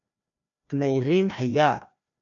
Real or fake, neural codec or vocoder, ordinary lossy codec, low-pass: fake; codec, 16 kHz, 1 kbps, FreqCodec, larger model; MP3, 96 kbps; 7.2 kHz